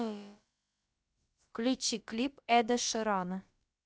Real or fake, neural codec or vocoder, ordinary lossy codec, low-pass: fake; codec, 16 kHz, about 1 kbps, DyCAST, with the encoder's durations; none; none